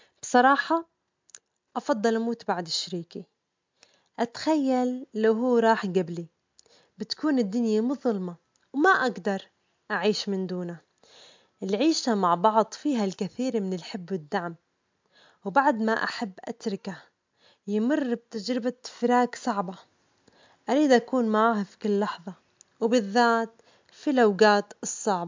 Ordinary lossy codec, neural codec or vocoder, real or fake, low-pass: MP3, 64 kbps; none; real; 7.2 kHz